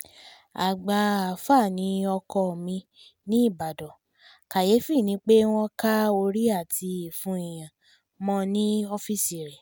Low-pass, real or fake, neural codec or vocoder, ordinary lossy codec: none; real; none; none